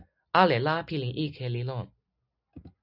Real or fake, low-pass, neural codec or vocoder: real; 5.4 kHz; none